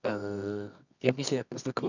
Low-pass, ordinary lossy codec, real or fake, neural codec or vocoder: 7.2 kHz; none; fake; codec, 24 kHz, 0.9 kbps, WavTokenizer, medium music audio release